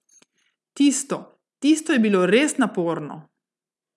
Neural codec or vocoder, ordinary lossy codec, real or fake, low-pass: none; none; real; none